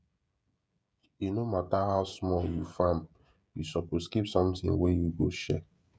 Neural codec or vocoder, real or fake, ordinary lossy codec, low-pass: codec, 16 kHz, 16 kbps, FreqCodec, smaller model; fake; none; none